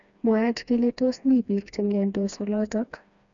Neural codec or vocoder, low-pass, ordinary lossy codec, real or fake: codec, 16 kHz, 2 kbps, FreqCodec, smaller model; 7.2 kHz; MP3, 96 kbps; fake